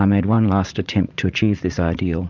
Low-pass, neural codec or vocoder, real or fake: 7.2 kHz; vocoder, 44.1 kHz, 80 mel bands, Vocos; fake